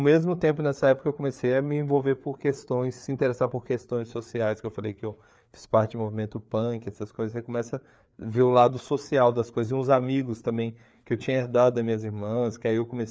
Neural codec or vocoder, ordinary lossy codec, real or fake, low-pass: codec, 16 kHz, 4 kbps, FreqCodec, larger model; none; fake; none